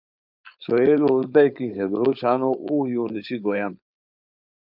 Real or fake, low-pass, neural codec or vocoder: fake; 5.4 kHz; codec, 16 kHz, 4.8 kbps, FACodec